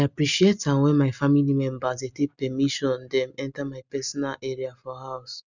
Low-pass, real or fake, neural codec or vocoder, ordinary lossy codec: 7.2 kHz; real; none; none